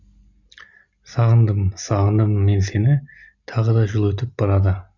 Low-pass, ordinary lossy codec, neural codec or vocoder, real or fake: 7.2 kHz; none; none; real